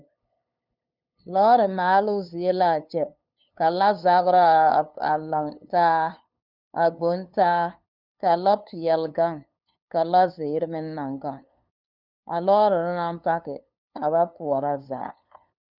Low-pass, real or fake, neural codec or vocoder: 5.4 kHz; fake; codec, 16 kHz, 2 kbps, FunCodec, trained on LibriTTS, 25 frames a second